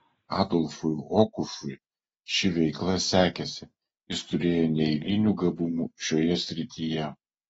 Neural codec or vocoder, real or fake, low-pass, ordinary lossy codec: none; real; 19.8 kHz; AAC, 24 kbps